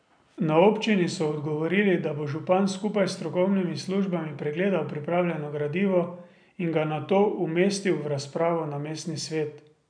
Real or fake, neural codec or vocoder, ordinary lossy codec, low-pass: real; none; none; 9.9 kHz